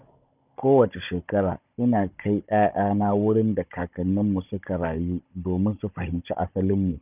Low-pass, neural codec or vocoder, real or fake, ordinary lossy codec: 3.6 kHz; codec, 16 kHz, 16 kbps, FunCodec, trained on Chinese and English, 50 frames a second; fake; none